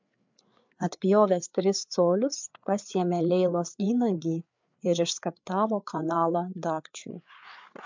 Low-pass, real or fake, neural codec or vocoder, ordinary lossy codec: 7.2 kHz; fake; codec, 16 kHz, 4 kbps, FreqCodec, larger model; MP3, 64 kbps